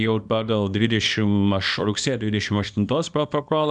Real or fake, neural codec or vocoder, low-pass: fake; codec, 24 kHz, 0.9 kbps, WavTokenizer, small release; 10.8 kHz